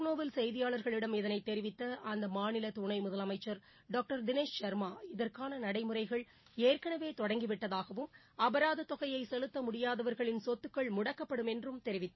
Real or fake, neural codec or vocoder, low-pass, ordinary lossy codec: real; none; 7.2 kHz; MP3, 24 kbps